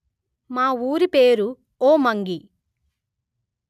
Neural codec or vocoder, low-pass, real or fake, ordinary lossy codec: none; 14.4 kHz; real; none